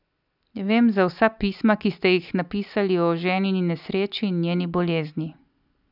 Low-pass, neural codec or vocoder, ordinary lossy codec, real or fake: 5.4 kHz; none; none; real